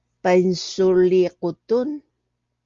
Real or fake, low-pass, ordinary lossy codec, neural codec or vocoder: real; 7.2 kHz; Opus, 32 kbps; none